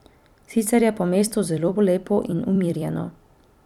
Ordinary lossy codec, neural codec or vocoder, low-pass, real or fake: none; vocoder, 44.1 kHz, 128 mel bands every 256 samples, BigVGAN v2; 19.8 kHz; fake